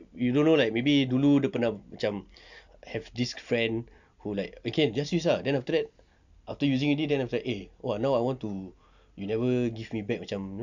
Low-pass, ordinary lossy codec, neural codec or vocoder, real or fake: 7.2 kHz; none; none; real